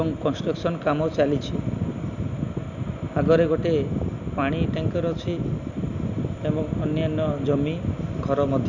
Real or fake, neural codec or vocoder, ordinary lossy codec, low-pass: real; none; none; 7.2 kHz